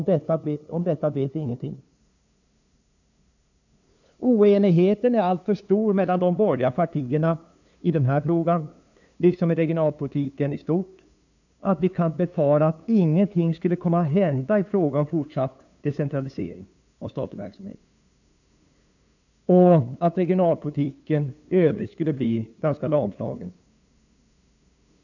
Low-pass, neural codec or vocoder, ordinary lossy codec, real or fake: 7.2 kHz; codec, 16 kHz, 2 kbps, FunCodec, trained on LibriTTS, 25 frames a second; none; fake